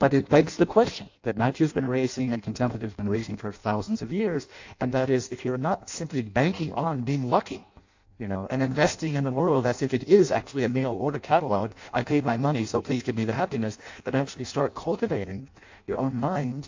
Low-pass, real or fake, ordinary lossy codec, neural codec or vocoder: 7.2 kHz; fake; AAC, 48 kbps; codec, 16 kHz in and 24 kHz out, 0.6 kbps, FireRedTTS-2 codec